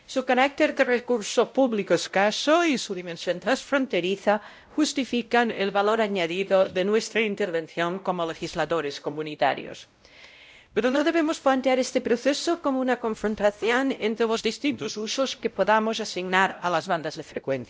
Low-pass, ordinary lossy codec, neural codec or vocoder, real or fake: none; none; codec, 16 kHz, 0.5 kbps, X-Codec, WavLM features, trained on Multilingual LibriSpeech; fake